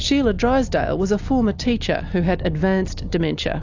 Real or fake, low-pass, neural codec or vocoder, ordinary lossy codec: real; 7.2 kHz; none; AAC, 48 kbps